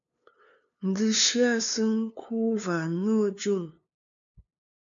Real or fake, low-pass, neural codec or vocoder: fake; 7.2 kHz; codec, 16 kHz, 2 kbps, FunCodec, trained on LibriTTS, 25 frames a second